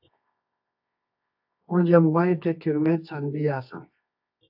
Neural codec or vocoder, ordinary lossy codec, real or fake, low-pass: codec, 24 kHz, 0.9 kbps, WavTokenizer, medium music audio release; AAC, 48 kbps; fake; 5.4 kHz